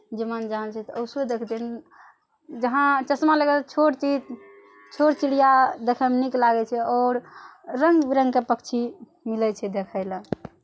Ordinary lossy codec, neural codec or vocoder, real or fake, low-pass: none; none; real; none